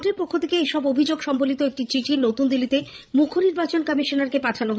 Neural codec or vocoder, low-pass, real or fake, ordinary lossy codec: codec, 16 kHz, 16 kbps, FreqCodec, larger model; none; fake; none